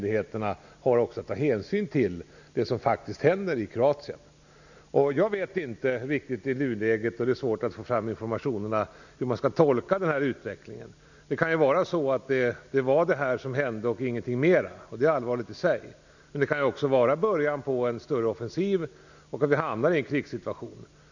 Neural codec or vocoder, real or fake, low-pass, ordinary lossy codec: none; real; 7.2 kHz; none